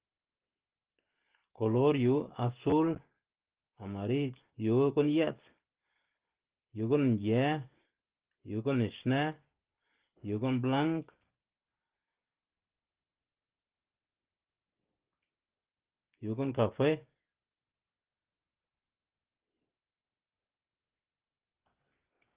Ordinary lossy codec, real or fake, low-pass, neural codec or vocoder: Opus, 16 kbps; real; 3.6 kHz; none